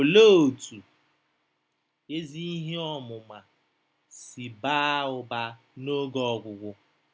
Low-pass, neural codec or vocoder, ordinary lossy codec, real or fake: none; none; none; real